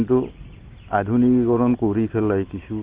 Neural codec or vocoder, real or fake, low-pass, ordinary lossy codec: none; real; 3.6 kHz; Opus, 16 kbps